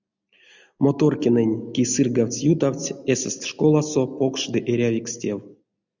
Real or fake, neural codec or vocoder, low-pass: real; none; 7.2 kHz